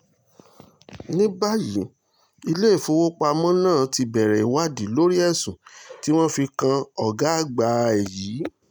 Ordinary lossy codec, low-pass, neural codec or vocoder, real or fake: none; none; none; real